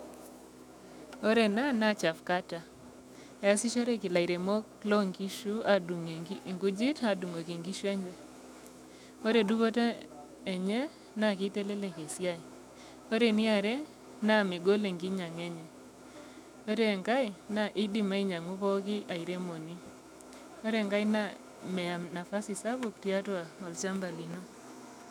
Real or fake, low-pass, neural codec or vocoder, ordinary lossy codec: fake; 19.8 kHz; autoencoder, 48 kHz, 128 numbers a frame, DAC-VAE, trained on Japanese speech; none